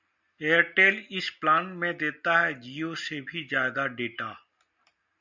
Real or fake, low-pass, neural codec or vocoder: real; 7.2 kHz; none